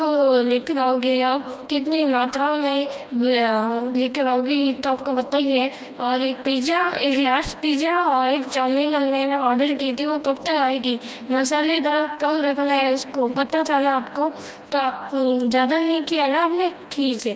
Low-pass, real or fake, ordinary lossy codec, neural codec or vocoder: none; fake; none; codec, 16 kHz, 1 kbps, FreqCodec, smaller model